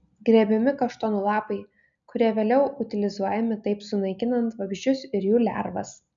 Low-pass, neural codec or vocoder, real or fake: 7.2 kHz; none; real